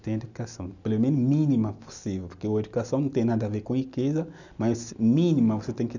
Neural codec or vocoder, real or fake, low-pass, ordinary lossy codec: none; real; 7.2 kHz; none